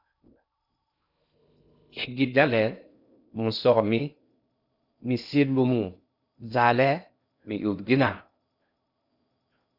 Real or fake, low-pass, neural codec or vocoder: fake; 5.4 kHz; codec, 16 kHz in and 24 kHz out, 0.6 kbps, FocalCodec, streaming, 2048 codes